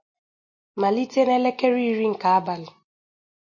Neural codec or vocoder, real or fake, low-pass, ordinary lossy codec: none; real; 7.2 kHz; MP3, 32 kbps